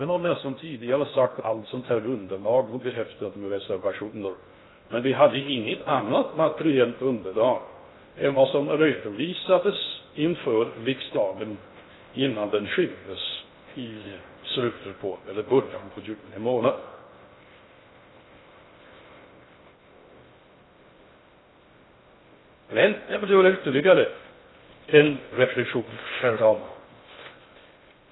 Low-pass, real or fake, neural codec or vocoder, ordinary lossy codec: 7.2 kHz; fake; codec, 16 kHz in and 24 kHz out, 0.6 kbps, FocalCodec, streaming, 2048 codes; AAC, 16 kbps